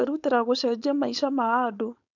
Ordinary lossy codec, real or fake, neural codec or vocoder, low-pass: none; fake; codec, 16 kHz, 4.8 kbps, FACodec; 7.2 kHz